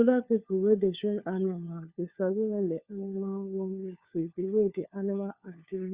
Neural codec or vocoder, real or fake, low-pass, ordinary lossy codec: codec, 16 kHz, 4 kbps, FunCodec, trained on LibriTTS, 50 frames a second; fake; 3.6 kHz; Opus, 64 kbps